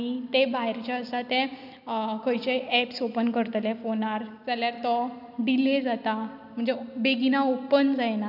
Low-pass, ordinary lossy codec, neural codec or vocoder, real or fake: 5.4 kHz; none; none; real